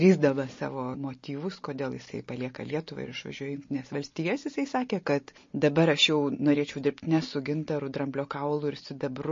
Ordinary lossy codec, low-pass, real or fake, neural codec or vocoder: MP3, 32 kbps; 7.2 kHz; real; none